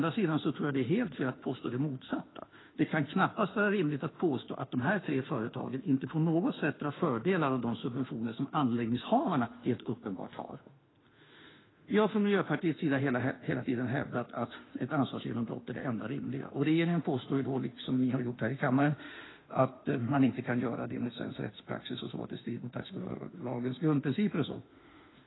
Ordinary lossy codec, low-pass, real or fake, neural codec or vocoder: AAC, 16 kbps; 7.2 kHz; fake; autoencoder, 48 kHz, 32 numbers a frame, DAC-VAE, trained on Japanese speech